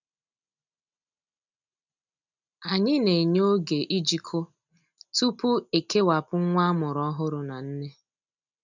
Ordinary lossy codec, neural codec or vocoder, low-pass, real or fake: none; none; 7.2 kHz; real